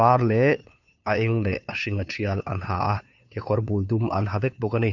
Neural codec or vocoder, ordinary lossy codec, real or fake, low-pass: codec, 16 kHz, 2 kbps, FunCodec, trained on Chinese and English, 25 frames a second; none; fake; 7.2 kHz